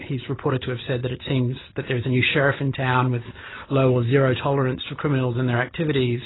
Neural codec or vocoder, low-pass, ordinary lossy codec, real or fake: none; 7.2 kHz; AAC, 16 kbps; real